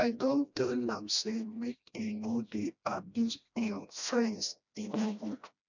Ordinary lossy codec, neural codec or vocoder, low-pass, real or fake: none; codec, 16 kHz, 1 kbps, FreqCodec, smaller model; 7.2 kHz; fake